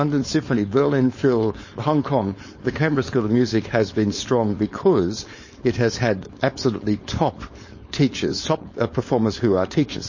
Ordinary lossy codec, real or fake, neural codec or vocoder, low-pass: MP3, 32 kbps; fake; codec, 16 kHz, 4.8 kbps, FACodec; 7.2 kHz